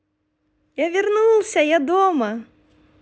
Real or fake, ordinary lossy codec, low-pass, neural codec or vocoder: real; none; none; none